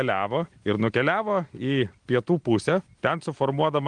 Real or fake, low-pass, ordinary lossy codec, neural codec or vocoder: real; 9.9 kHz; Opus, 24 kbps; none